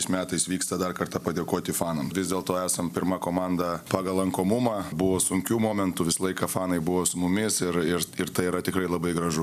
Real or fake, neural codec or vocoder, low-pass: real; none; 14.4 kHz